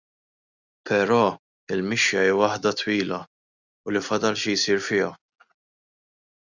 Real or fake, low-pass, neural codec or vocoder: real; 7.2 kHz; none